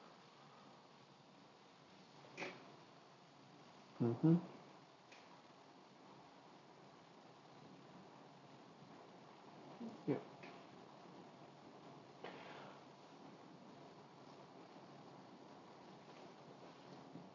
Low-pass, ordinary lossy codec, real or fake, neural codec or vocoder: 7.2 kHz; none; real; none